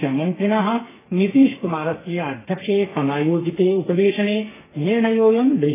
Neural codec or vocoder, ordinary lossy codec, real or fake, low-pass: codec, 32 kHz, 1.9 kbps, SNAC; AAC, 16 kbps; fake; 3.6 kHz